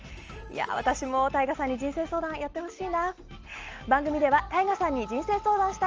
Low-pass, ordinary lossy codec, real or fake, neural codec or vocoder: 7.2 kHz; Opus, 24 kbps; real; none